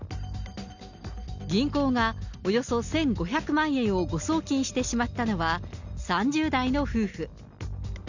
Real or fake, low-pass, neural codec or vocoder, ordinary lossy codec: real; 7.2 kHz; none; none